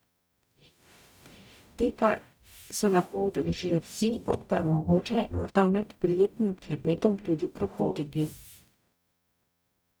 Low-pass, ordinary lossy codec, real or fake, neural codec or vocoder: none; none; fake; codec, 44.1 kHz, 0.9 kbps, DAC